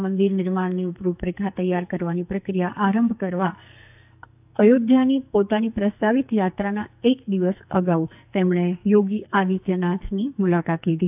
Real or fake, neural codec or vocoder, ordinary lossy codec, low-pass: fake; codec, 44.1 kHz, 2.6 kbps, SNAC; AAC, 32 kbps; 3.6 kHz